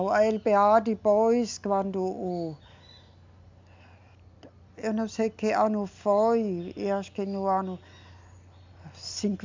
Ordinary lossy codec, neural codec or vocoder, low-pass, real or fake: MP3, 64 kbps; none; 7.2 kHz; real